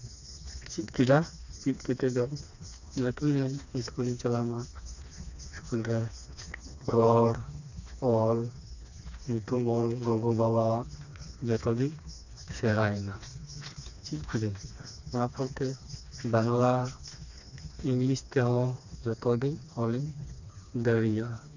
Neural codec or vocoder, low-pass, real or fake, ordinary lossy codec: codec, 16 kHz, 2 kbps, FreqCodec, smaller model; 7.2 kHz; fake; none